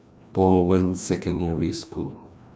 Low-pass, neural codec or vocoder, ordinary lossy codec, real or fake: none; codec, 16 kHz, 1 kbps, FreqCodec, larger model; none; fake